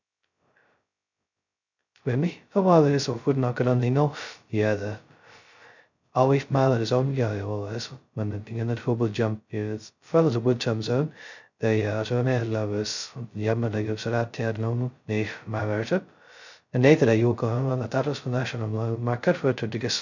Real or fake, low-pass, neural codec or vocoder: fake; 7.2 kHz; codec, 16 kHz, 0.2 kbps, FocalCodec